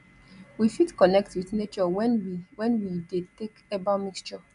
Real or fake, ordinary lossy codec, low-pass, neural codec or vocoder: real; MP3, 96 kbps; 10.8 kHz; none